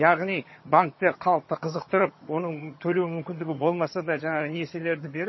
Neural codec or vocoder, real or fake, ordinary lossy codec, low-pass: vocoder, 22.05 kHz, 80 mel bands, HiFi-GAN; fake; MP3, 24 kbps; 7.2 kHz